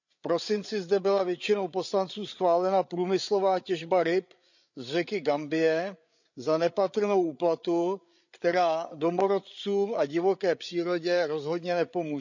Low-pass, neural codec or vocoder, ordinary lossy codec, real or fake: 7.2 kHz; codec, 16 kHz, 8 kbps, FreqCodec, larger model; none; fake